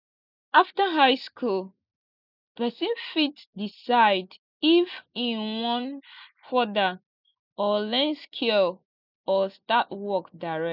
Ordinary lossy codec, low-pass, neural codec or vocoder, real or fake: none; 5.4 kHz; none; real